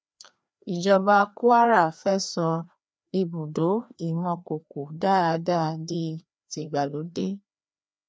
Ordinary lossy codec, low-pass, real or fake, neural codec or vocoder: none; none; fake; codec, 16 kHz, 2 kbps, FreqCodec, larger model